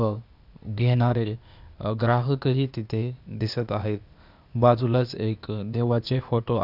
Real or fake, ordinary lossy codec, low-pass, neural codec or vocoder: fake; none; 5.4 kHz; codec, 16 kHz, 0.8 kbps, ZipCodec